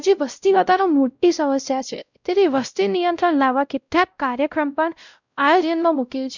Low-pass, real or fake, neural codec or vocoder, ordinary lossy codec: 7.2 kHz; fake; codec, 16 kHz, 0.5 kbps, X-Codec, WavLM features, trained on Multilingual LibriSpeech; none